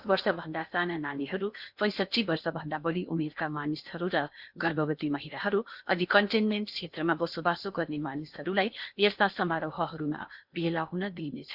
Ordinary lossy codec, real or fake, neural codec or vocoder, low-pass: Opus, 64 kbps; fake; codec, 16 kHz in and 24 kHz out, 0.8 kbps, FocalCodec, streaming, 65536 codes; 5.4 kHz